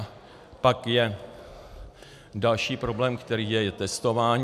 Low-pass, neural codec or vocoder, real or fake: 14.4 kHz; none; real